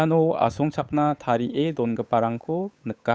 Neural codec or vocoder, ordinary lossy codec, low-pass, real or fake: codec, 16 kHz, 8 kbps, FunCodec, trained on Chinese and English, 25 frames a second; none; none; fake